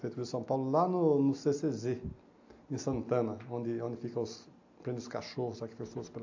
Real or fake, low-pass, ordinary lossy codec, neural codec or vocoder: real; 7.2 kHz; AAC, 48 kbps; none